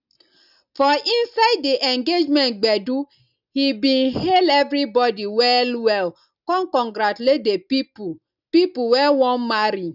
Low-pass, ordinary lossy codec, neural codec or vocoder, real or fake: 5.4 kHz; none; none; real